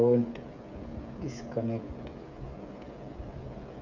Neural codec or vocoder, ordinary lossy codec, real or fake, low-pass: codec, 16 kHz, 8 kbps, FreqCodec, smaller model; none; fake; 7.2 kHz